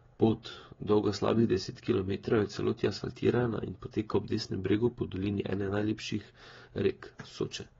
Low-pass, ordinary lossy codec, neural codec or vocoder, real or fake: 7.2 kHz; AAC, 24 kbps; codec, 16 kHz, 16 kbps, FreqCodec, smaller model; fake